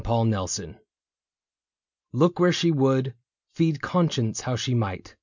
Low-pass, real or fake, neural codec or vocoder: 7.2 kHz; real; none